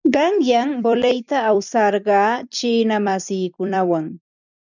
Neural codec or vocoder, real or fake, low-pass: vocoder, 44.1 kHz, 128 mel bands every 256 samples, BigVGAN v2; fake; 7.2 kHz